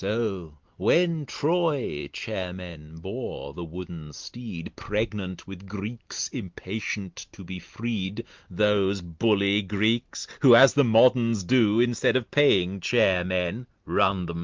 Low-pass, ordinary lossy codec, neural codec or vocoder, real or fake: 7.2 kHz; Opus, 32 kbps; none; real